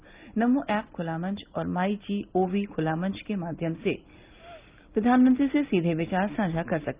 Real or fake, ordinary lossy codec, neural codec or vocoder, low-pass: real; Opus, 24 kbps; none; 3.6 kHz